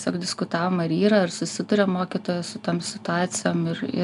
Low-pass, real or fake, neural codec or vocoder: 10.8 kHz; real; none